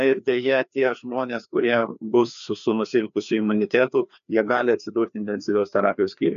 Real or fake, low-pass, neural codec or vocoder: fake; 7.2 kHz; codec, 16 kHz, 2 kbps, FreqCodec, larger model